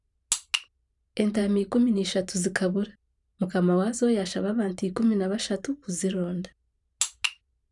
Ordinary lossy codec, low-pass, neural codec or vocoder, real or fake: none; 10.8 kHz; vocoder, 44.1 kHz, 128 mel bands every 512 samples, BigVGAN v2; fake